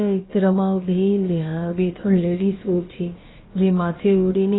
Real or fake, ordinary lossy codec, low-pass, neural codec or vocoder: fake; AAC, 16 kbps; 7.2 kHz; codec, 16 kHz, about 1 kbps, DyCAST, with the encoder's durations